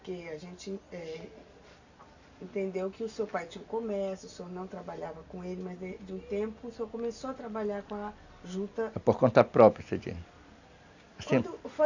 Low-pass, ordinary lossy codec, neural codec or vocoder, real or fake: 7.2 kHz; none; none; real